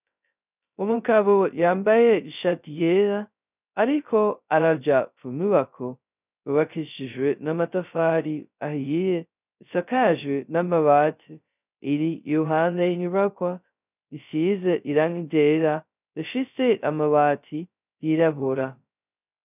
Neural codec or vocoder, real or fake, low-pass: codec, 16 kHz, 0.2 kbps, FocalCodec; fake; 3.6 kHz